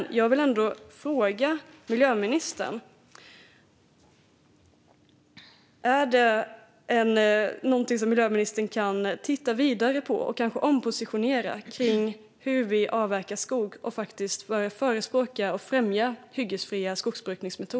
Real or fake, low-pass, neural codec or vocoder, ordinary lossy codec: real; none; none; none